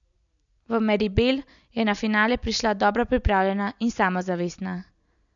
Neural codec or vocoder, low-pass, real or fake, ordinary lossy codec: none; 7.2 kHz; real; none